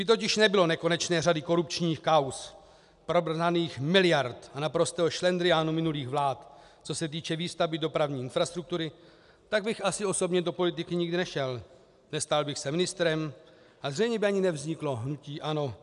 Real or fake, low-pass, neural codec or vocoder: real; 10.8 kHz; none